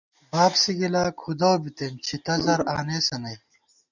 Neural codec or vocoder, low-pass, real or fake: none; 7.2 kHz; real